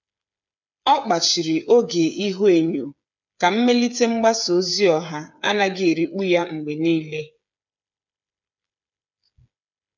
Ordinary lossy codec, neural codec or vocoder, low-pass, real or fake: none; codec, 16 kHz, 8 kbps, FreqCodec, smaller model; 7.2 kHz; fake